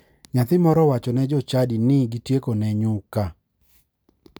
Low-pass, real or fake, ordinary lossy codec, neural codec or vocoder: none; real; none; none